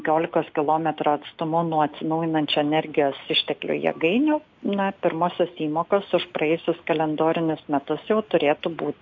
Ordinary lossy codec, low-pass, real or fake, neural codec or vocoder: MP3, 48 kbps; 7.2 kHz; real; none